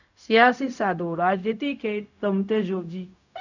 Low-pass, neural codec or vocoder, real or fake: 7.2 kHz; codec, 16 kHz, 0.4 kbps, LongCat-Audio-Codec; fake